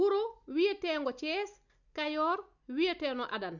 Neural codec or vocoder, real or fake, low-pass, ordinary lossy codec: none; real; 7.2 kHz; none